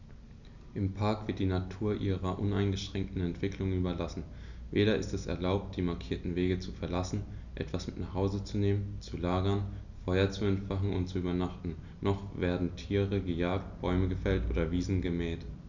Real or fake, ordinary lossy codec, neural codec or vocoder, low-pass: real; none; none; 7.2 kHz